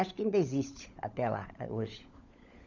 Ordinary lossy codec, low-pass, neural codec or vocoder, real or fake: none; 7.2 kHz; codec, 16 kHz, 16 kbps, FunCodec, trained on LibriTTS, 50 frames a second; fake